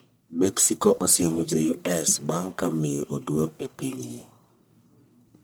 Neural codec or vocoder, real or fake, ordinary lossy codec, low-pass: codec, 44.1 kHz, 1.7 kbps, Pupu-Codec; fake; none; none